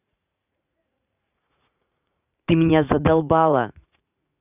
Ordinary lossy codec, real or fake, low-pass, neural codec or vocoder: none; fake; 3.6 kHz; vocoder, 44.1 kHz, 80 mel bands, Vocos